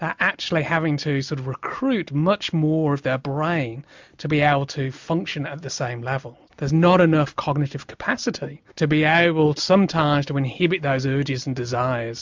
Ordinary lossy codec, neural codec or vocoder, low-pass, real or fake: MP3, 64 kbps; none; 7.2 kHz; real